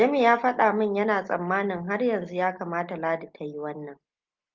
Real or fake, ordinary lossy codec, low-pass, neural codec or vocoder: real; Opus, 24 kbps; 7.2 kHz; none